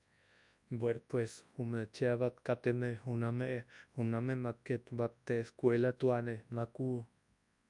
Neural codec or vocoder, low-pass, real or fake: codec, 24 kHz, 0.9 kbps, WavTokenizer, large speech release; 10.8 kHz; fake